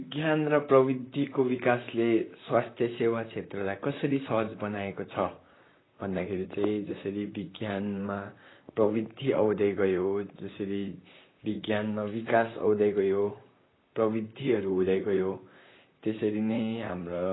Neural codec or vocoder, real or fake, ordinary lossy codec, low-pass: vocoder, 44.1 kHz, 128 mel bands, Pupu-Vocoder; fake; AAC, 16 kbps; 7.2 kHz